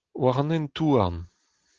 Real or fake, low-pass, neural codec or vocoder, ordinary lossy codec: real; 7.2 kHz; none; Opus, 16 kbps